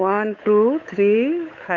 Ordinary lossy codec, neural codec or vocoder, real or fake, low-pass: MP3, 64 kbps; codec, 16 kHz, 8 kbps, FunCodec, trained on Chinese and English, 25 frames a second; fake; 7.2 kHz